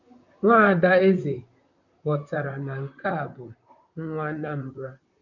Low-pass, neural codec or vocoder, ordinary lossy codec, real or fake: 7.2 kHz; vocoder, 44.1 kHz, 128 mel bands, Pupu-Vocoder; none; fake